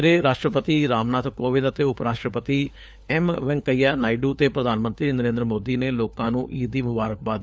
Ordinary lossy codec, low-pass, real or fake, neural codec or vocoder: none; none; fake; codec, 16 kHz, 4 kbps, FunCodec, trained on LibriTTS, 50 frames a second